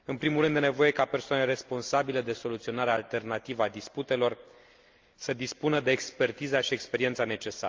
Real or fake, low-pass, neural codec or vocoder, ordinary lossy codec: real; 7.2 kHz; none; Opus, 24 kbps